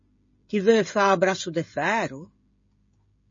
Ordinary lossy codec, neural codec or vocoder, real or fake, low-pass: MP3, 32 kbps; codec, 16 kHz, 16 kbps, FreqCodec, smaller model; fake; 7.2 kHz